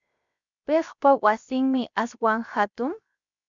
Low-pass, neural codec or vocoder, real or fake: 7.2 kHz; codec, 16 kHz, 0.7 kbps, FocalCodec; fake